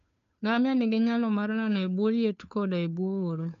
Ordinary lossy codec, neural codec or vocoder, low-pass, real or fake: none; codec, 16 kHz, 2 kbps, FunCodec, trained on Chinese and English, 25 frames a second; 7.2 kHz; fake